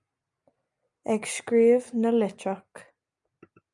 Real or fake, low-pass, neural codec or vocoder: real; 10.8 kHz; none